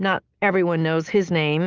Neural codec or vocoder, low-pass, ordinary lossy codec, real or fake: none; 7.2 kHz; Opus, 32 kbps; real